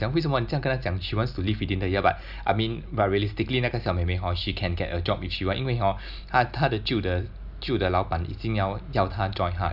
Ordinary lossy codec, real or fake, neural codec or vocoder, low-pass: none; real; none; 5.4 kHz